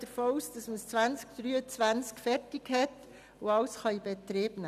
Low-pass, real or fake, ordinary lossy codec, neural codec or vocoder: 14.4 kHz; real; none; none